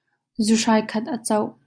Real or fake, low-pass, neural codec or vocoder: real; 9.9 kHz; none